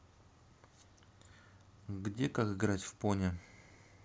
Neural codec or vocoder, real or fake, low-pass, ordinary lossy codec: none; real; none; none